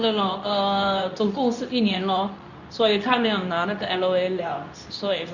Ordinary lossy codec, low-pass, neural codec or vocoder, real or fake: none; 7.2 kHz; codec, 24 kHz, 0.9 kbps, WavTokenizer, medium speech release version 2; fake